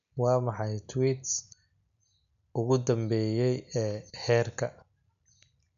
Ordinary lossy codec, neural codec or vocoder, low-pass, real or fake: none; none; 7.2 kHz; real